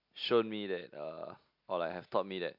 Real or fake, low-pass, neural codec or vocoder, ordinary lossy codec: real; 5.4 kHz; none; MP3, 48 kbps